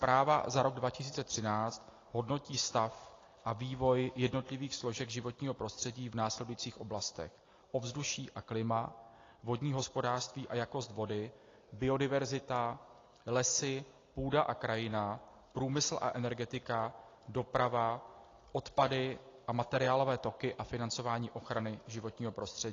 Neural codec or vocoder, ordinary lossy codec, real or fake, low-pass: none; AAC, 32 kbps; real; 7.2 kHz